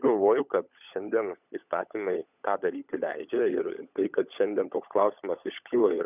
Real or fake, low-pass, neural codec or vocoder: fake; 3.6 kHz; codec, 16 kHz, 16 kbps, FunCodec, trained on LibriTTS, 50 frames a second